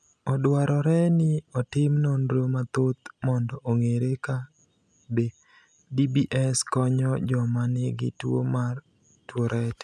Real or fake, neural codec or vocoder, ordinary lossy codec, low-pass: real; none; none; none